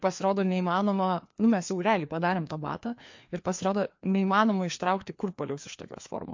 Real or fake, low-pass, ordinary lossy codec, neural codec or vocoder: fake; 7.2 kHz; MP3, 48 kbps; codec, 16 kHz, 2 kbps, FreqCodec, larger model